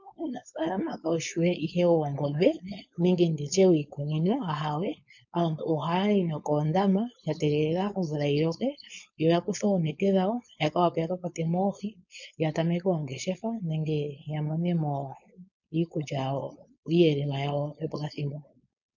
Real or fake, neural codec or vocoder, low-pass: fake; codec, 16 kHz, 4.8 kbps, FACodec; 7.2 kHz